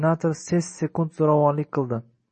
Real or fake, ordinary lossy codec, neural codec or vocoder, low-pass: real; MP3, 32 kbps; none; 10.8 kHz